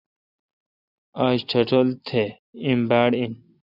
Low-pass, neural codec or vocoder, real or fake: 5.4 kHz; none; real